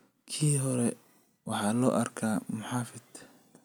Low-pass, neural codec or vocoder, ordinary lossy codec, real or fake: none; none; none; real